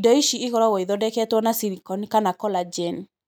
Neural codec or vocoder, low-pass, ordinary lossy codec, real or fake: none; none; none; real